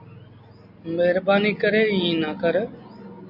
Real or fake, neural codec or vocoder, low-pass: real; none; 5.4 kHz